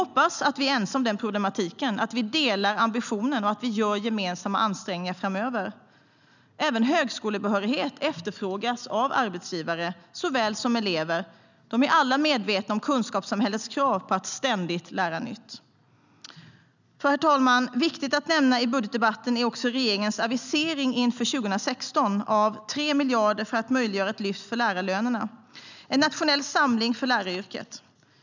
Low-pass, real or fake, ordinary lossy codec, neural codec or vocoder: 7.2 kHz; real; none; none